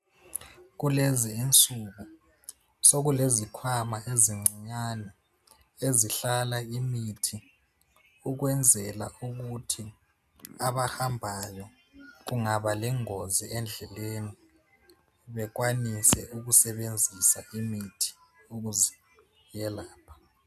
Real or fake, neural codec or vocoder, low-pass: real; none; 14.4 kHz